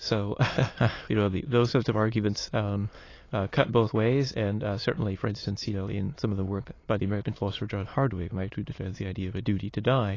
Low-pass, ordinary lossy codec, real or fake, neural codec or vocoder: 7.2 kHz; AAC, 32 kbps; fake; autoencoder, 22.05 kHz, a latent of 192 numbers a frame, VITS, trained on many speakers